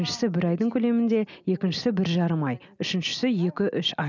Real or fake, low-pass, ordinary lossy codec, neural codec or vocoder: real; 7.2 kHz; none; none